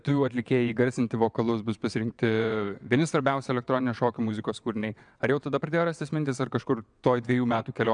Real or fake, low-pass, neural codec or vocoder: fake; 9.9 kHz; vocoder, 22.05 kHz, 80 mel bands, WaveNeXt